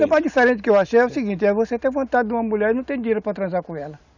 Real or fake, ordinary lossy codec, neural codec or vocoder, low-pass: real; none; none; 7.2 kHz